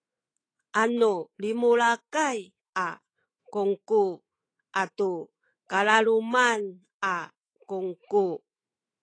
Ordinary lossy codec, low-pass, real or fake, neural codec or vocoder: AAC, 32 kbps; 9.9 kHz; fake; autoencoder, 48 kHz, 128 numbers a frame, DAC-VAE, trained on Japanese speech